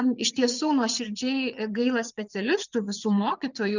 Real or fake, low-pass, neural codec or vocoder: fake; 7.2 kHz; vocoder, 22.05 kHz, 80 mel bands, WaveNeXt